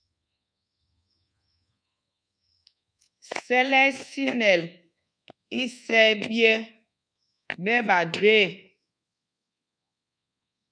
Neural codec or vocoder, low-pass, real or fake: codec, 24 kHz, 1.2 kbps, DualCodec; 9.9 kHz; fake